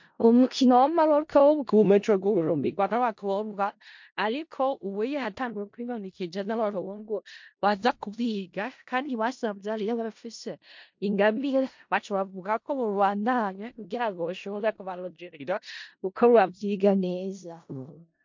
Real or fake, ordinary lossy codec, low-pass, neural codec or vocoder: fake; MP3, 48 kbps; 7.2 kHz; codec, 16 kHz in and 24 kHz out, 0.4 kbps, LongCat-Audio-Codec, four codebook decoder